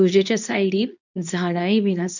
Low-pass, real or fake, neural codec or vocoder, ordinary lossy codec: 7.2 kHz; fake; codec, 24 kHz, 0.9 kbps, WavTokenizer, medium speech release version 2; none